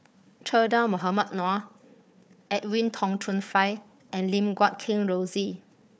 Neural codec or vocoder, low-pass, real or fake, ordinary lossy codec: codec, 16 kHz, 4 kbps, FunCodec, trained on Chinese and English, 50 frames a second; none; fake; none